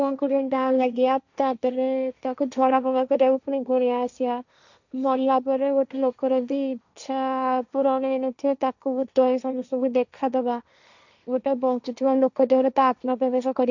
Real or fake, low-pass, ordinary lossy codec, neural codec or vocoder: fake; 7.2 kHz; none; codec, 16 kHz, 1.1 kbps, Voila-Tokenizer